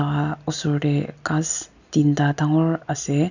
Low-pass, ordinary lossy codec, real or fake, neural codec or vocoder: 7.2 kHz; none; real; none